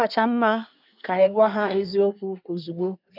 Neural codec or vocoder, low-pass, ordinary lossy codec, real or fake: codec, 24 kHz, 1 kbps, SNAC; 5.4 kHz; none; fake